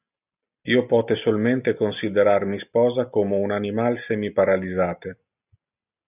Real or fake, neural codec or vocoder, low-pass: real; none; 3.6 kHz